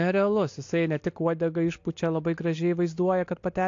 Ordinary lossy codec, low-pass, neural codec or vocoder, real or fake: AAC, 48 kbps; 7.2 kHz; none; real